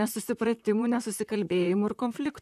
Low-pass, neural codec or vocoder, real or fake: 14.4 kHz; vocoder, 44.1 kHz, 128 mel bands, Pupu-Vocoder; fake